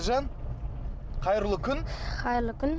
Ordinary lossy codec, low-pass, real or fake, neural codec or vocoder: none; none; real; none